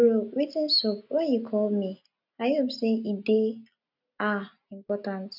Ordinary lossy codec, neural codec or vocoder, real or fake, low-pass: none; none; real; 5.4 kHz